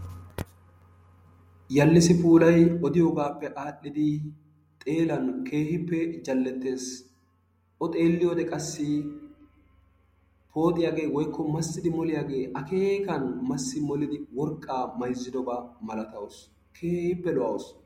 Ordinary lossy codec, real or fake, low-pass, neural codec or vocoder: MP3, 64 kbps; real; 19.8 kHz; none